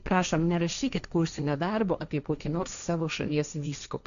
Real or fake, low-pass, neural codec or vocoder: fake; 7.2 kHz; codec, 16 kHz, 1.1 kbps, Voila-Tokenizer